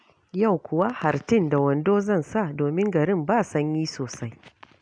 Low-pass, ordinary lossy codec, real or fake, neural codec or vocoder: 9.9 kHz; none; real; none